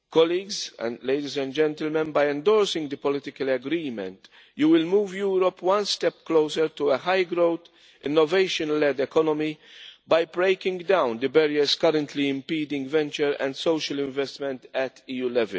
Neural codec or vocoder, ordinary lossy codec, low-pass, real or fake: none; none; none; real